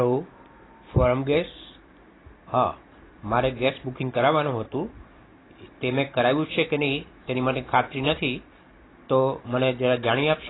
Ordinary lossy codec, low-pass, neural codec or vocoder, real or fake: AAC, 16 kbps; 7.2 kHz; none; real